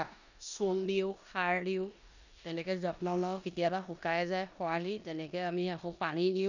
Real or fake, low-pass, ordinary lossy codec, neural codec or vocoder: fake; 7.2 kHz; none; codec, 16 kHz in and 24 kHz out, 0.9 kbps, LongCat-Audio-Codec, four codebook decoder